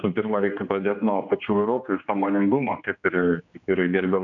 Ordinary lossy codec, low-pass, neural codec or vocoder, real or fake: MP3, 96 kbps; 7.2 kHz; codec, 16 kHz, 2 kbps, X-Codec, HuBERT features, trained on general audio; fake